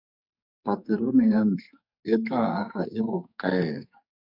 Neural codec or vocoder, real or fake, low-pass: codec, 44.1 kHz, 2.6 kbps, SNAC; fake; 5.4 kHz